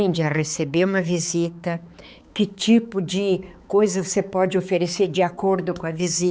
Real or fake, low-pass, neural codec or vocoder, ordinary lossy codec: fake; none; codec, 16 kHz, 4 kbps, X-Codec, HuBERT features, trained on balanced general audio; none